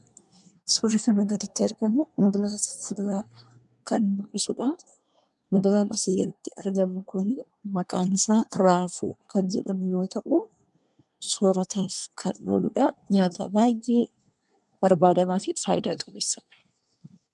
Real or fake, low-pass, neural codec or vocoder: fake; 10.8 kHz; codec, 24 kHz, 1 kbps, SNAC